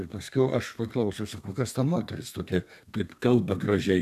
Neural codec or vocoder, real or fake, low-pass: codec, 32 kHz, 1.9 kbps, SNAC; fake; 14.4 kHz